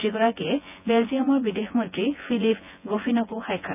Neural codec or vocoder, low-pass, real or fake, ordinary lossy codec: vocoder, 24 kHz, 100 mel bands, Vocos; 3.6 kHz; fake; none